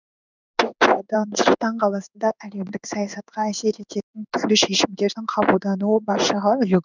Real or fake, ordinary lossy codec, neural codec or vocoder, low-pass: fake; none; codec, 16 kHz in and 24 kHz out, 1 kbps, XY-Tokenizer; 7.2 kHz